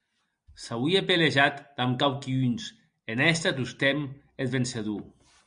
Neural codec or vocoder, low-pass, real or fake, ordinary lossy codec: none; 9.9 kHz; real; Opus, 64 kbps